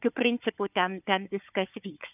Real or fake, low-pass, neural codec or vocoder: fake; 3.6 kHz; codec, 16 kHz, 4 kbps, FunCodec, trained on Chinese and English, 50 frames a second